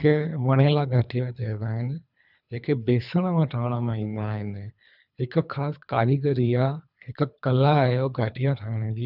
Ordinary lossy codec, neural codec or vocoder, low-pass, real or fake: none; codec, 24 kHz, 3 kbps, HILCodec; 5.4 kHz; fake